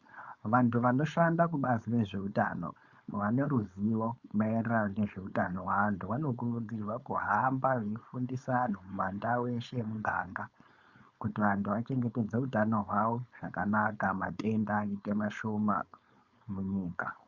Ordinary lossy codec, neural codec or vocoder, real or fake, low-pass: Opus, 64 kbps; codec, 16 kHz, 4.8 kbps, FACodec; fake; 7.2 kHz